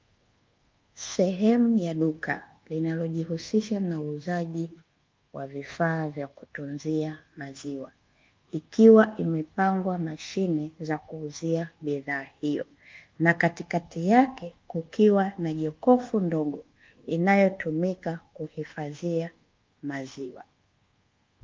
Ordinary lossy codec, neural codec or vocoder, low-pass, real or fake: Opus, 32 kbps; codec, 24 kHz, 1.2 kbps, DualCodec; 7.2 kHz; fake